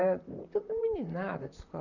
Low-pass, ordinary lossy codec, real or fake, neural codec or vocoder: 7.2 kHz; none; fake; vocoder, 44.1 kHz, 128 mel bands, Pupu-Vocoder